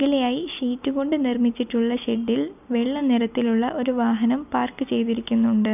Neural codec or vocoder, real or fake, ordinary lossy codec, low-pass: none; real; none; 3.6 kHz